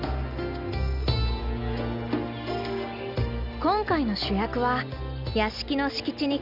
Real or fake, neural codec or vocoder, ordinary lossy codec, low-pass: real; none; none; 5.4 kHz